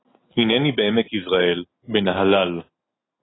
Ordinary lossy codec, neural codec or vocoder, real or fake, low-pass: AAC, 16 kbps; none; real; 7.2 kHz